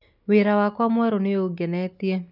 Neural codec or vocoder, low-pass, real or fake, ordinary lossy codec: none; 5.4 kHz; real; none